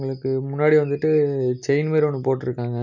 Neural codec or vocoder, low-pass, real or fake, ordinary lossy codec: none; 7.2 kHz; real; none